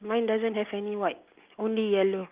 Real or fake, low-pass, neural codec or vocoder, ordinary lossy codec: real; 3.6 kHz; none; Opus, 32 kbps